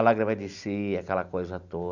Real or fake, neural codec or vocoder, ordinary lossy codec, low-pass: real; none; none; 7.2 kHz